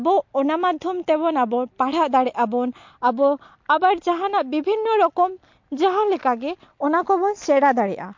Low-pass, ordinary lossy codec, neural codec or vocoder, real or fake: 7.2 kHz; MP3, 48 kbps; none; real